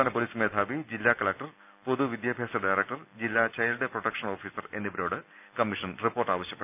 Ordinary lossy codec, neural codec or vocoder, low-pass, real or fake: none; none; 3.6 kHz; real